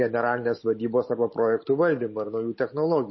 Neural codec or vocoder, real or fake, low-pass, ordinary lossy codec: none; real; 7.2 kHz; MP3, 24 kbps